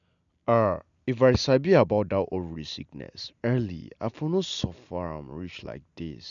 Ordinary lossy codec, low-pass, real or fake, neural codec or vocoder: none; 7.2 kHz; real; none